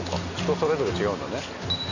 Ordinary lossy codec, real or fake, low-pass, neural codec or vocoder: none; real; 7.2 kHz; none